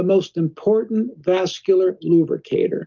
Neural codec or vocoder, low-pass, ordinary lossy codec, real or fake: none; 7.2 kHz; Opus, 32 kbps; real